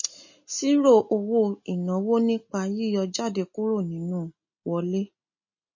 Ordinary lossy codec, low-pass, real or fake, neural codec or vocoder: MP3, 32 kbps; 7.2 kHz; real; none